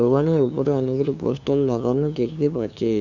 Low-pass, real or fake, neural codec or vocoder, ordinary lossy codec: 7.2 kHz; fake; codec, 16 kHz, 2 kbps, FunCodec, trained on LibriTTS, 25 frames a second; none